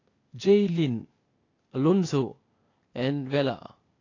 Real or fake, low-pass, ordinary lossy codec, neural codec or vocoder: fake; 7.2 kHz; AAC, 32 kbps; codec, 16 kHz, 0.8 kbps, ZipCodec